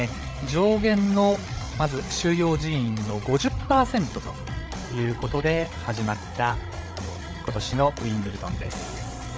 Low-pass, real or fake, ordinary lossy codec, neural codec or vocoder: none; fake; none; codec, 16 kHz, 8 kbps, FreqCodec, larger model